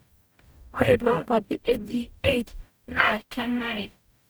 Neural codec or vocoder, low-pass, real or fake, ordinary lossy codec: codec, 44.1 kHz, 0.9 kbps, DAC; none; fake; none